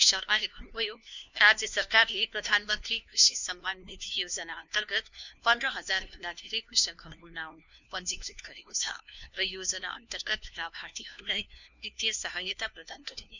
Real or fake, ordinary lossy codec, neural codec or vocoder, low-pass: fake; none; codec, 16 kHz, 1 kbps, FunCodec, trained on LibriTTS, 50 frames a second; 7.2 kHz